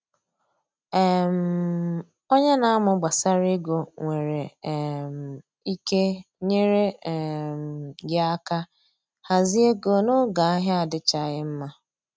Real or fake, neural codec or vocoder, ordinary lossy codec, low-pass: real; none; none; none